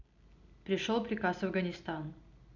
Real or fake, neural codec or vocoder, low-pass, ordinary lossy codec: real; none; 7.2 kHz; none